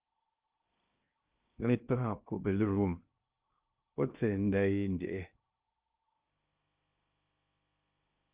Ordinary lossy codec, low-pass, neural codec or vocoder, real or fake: Opus, 24 kbps; 3.6 kHz; codec, 16 kHz in and 24 kHz out, 0.6 kbps, FocalCodec, streaming, 2048 codes; fake